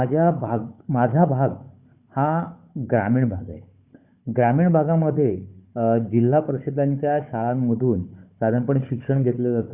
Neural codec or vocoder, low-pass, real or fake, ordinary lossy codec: codec, 16 kHz, 4 kbps, FunCodec, trained on Chinese and English, 50 frames a second; 3.6 kHz; fake; Opus, 64 kbps